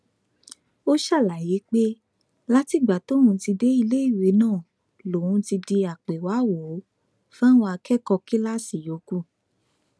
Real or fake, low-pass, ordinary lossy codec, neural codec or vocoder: real; none; none; none